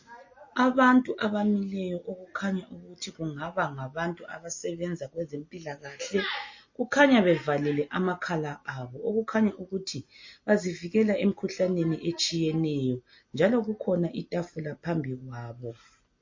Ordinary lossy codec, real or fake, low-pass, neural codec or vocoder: MP3, 32 kbps; real; 7.2 kHz; none